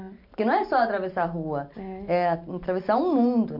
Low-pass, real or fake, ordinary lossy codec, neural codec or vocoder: 5.4 kHz; real; MP3, 48 kbps; none